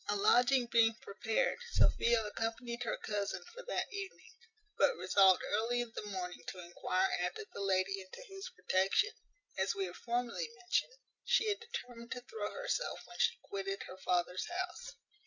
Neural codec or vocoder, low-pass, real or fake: none; 7.2 kHz; real